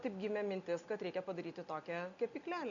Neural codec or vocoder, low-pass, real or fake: none; 7.2 kHz; real